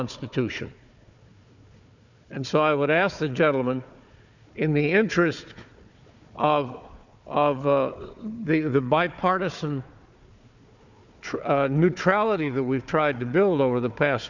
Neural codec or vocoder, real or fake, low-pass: codec, 16 kHz, 4 kbps, FunCodec, trained on Chinese and English, 50 frames a second; fake; 7.2 kHz